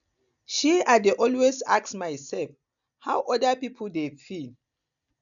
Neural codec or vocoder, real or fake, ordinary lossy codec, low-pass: none; real; none; 7.2 kHz